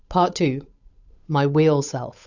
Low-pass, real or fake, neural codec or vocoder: 7.2 kHz; fake; codec, 16 kHz, 8 kbps, FunCodec, trained on Chinese and English, 25 frames a second